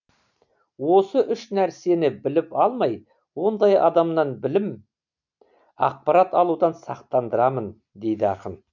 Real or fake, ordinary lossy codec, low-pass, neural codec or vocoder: real; none; 7.2 kHz; none